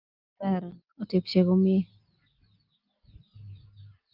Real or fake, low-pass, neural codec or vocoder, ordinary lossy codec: real; 5.4 kHz; none; Opus, 24 kbps